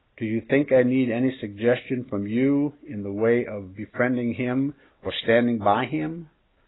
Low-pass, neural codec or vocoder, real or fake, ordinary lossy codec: 7.2 kHz; none; real; AAC, 16 kbps